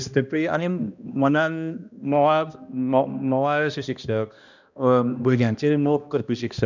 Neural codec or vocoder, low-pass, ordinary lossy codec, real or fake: codec, 16 kHz, 1 kbps, X-Codec, HuBERT features, trained on balanced general audio; 7.2 kHz; none; fake